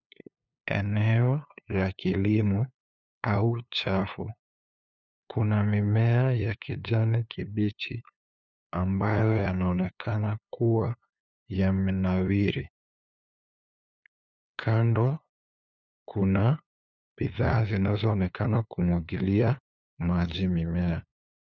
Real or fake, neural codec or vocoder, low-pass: fake; codec, 16 kHz, 2 kbps, FunCodec, trained on LibriTTS, 25 frames a second; 7.2 kHz